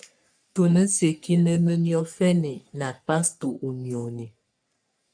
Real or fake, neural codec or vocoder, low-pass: fake; codec, 44.1 kHz, 3.4 kbps, Pupu-Codec; 9.9 kHz